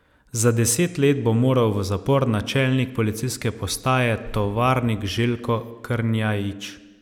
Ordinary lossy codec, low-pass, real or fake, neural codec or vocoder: none; 19.8 kHz; real; none